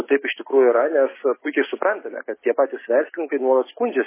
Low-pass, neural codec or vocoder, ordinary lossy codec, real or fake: 3.6 kHz; none; MP3, 16 kbps; real